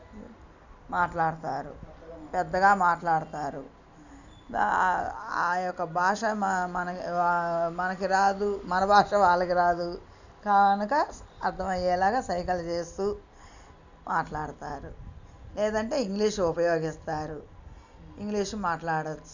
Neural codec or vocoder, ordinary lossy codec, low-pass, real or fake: none; none; 7.2 kHz; real